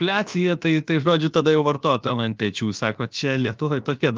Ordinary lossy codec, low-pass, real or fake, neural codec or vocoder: Opus, 32 kbps; 7.2 kHz; fake; codec, 16 kHz, about 1 kbps, DyCAST, with the encoder's durations